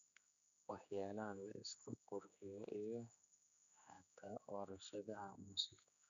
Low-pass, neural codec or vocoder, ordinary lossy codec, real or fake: 7.2 kHz; codec, 16 kHz, 2 kbps, X-Codec, HuBERT features, trained on general audio; none; fake